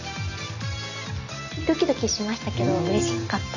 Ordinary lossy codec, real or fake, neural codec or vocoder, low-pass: none; real; none; 7.2 kHz